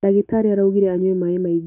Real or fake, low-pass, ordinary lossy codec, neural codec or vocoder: real; 3.6 kHz; none; none